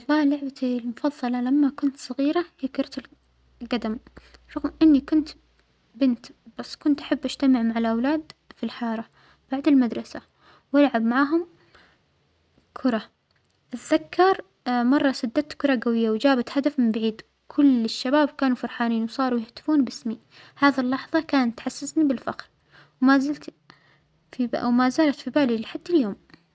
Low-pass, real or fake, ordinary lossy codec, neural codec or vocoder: none; real; none; none